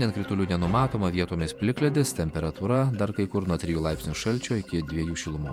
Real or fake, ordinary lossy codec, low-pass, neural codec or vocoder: fake; MP3, 96 kbps; 19.8 kHz; vocoder, 48 kHz, 128 mel bands, Vocos